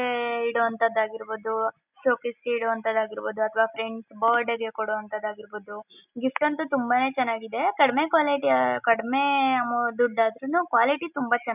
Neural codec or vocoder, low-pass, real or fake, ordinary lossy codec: none; 3.6 kHz; real; none